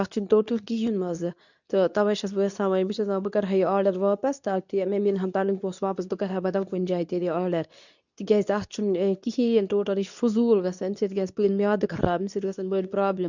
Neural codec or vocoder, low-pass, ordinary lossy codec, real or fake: codec, 24 kHz, 0.9 kbps, WavTokenizer, medium speech release version 2; 7.2 kHz; none; fake